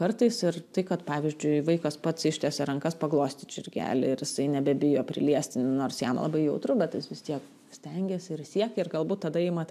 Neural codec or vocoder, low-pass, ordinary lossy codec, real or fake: none; 14.4 kHz; AAC, 96 kbps; real